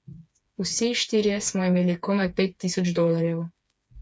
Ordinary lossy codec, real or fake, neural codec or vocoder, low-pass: none; fake; codec, 16 kHz, 4 kbps, FreqCodec, smaller model; none